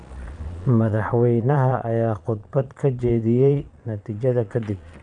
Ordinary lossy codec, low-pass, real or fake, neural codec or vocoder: none; 9.9 kHz; fake; vocoder, 22.05 kHz, 80 mel bands, Vocos